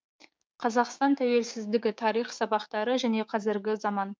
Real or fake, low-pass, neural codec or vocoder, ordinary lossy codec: fake; none; codec, 16 kHz, 6 kbps, DAC; none